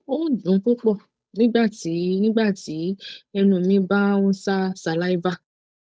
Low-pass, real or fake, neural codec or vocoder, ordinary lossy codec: none; fake; codec, 16 kHz, 8 kbps, FunCodec, trained on Chinese and English, 25 frames a second; none